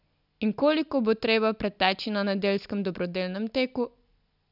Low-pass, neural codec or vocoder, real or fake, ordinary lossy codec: 5.4 kHz; none; real; none